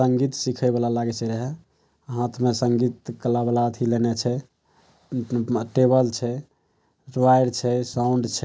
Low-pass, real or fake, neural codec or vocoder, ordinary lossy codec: none; real; none; none